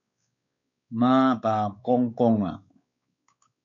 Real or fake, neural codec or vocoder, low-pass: fake; codec, 16 kHz, 4 kbps, X-Codec, WavLM features, trained on Multilingual LibriSpeech; 7.2 kHz